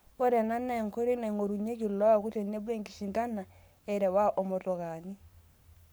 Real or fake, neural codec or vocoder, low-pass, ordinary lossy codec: fake; codec, 44.1 kHz, 7.8 kbps, Pupu-Codec; none; none